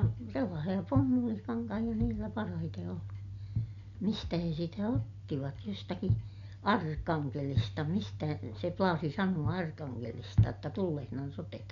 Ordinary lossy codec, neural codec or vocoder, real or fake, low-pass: none; none; real; 7.2 kHz